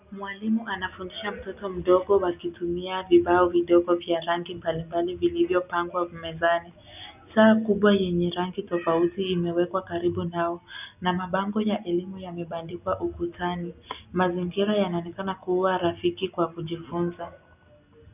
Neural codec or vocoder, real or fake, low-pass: none; real; 3.6 kHz